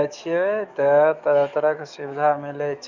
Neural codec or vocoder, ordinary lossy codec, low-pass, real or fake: codec, 44.1 kHz, 7.8 kbps, DAC; none; 7.2 kHz; fake